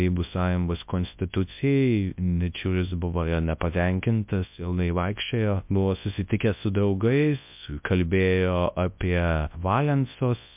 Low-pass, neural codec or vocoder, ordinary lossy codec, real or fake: 3.6 kHz; codec, 24 kHz, 0.9 kbps, WavTokenizer, large speech release; MP3, 32 kbps; fake